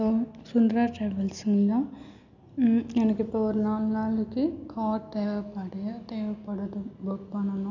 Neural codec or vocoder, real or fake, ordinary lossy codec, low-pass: none; real; none; 7.2 kHz